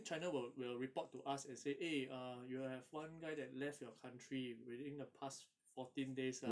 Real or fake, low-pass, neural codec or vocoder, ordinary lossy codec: real; none; none; none